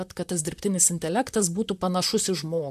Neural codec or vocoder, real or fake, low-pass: vocoder, 44.1 kHz, 128 mel bands, Pupu-Vocoder; fake; 14.4 kHz